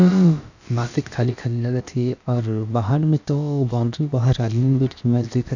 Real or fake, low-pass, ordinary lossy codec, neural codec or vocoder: fake; 7.2 kHz; none; codec, 16 kHz, about 1 kbps, DyCAST, with the encoder's durations